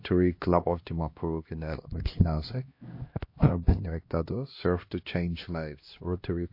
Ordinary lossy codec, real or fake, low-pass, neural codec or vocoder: MP3, 32 kbps; fake; 5.4 kHz; codec, 16 kHz, 1 kbps, X-Codec, HuBERT features, trained on LibriSpeech